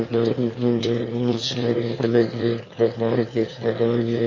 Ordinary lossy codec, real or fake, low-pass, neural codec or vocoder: MP3, 32 kbps; fake; 7.2 kHz; autoencoder, 22.05 kHz, a latent of 192 numbers a frame, VITS, trained on one speaker